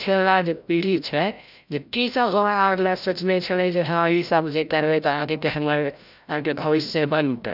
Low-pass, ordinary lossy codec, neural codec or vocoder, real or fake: 5.4 kHz; none; codec, 16 kHz, 0.5 kbps, FreqCodec, larger model; fake